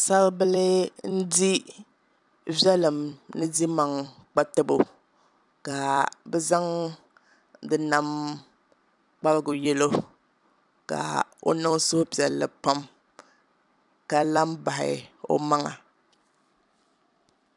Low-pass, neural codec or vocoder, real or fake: 10.8 kHz; vocoder, 44.1 kHz, 128 mel bands every 256 samples, BigVGAN v2; fake